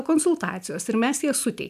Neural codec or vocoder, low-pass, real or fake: none; 14.4 kHz; real